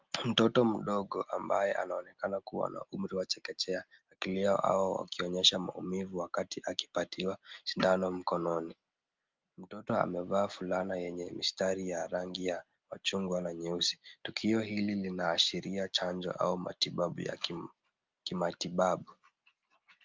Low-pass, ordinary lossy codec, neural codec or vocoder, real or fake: 7.2 kHz; Opus, 24 kbps; none; real